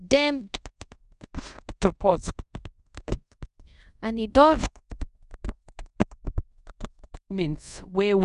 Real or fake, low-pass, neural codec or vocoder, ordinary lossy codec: fake; 10.8 kHz; codec, 24 kHz, 0.5 kbps, DualCodec; none